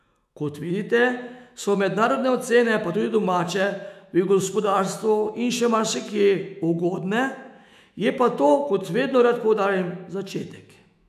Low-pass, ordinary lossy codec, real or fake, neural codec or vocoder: 14.4 kHz; none; fake; autoencoder, 48 kHz, 128 numbers a frame, DAC-VAE, trained on Japanese speech